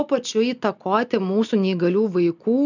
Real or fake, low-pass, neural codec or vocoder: real; 7.2 kHz; none